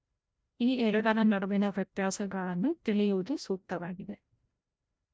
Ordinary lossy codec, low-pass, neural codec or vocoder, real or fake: none; none; codec, 16 kHz, 0.5 kbps, FreqCodec, larger model; fake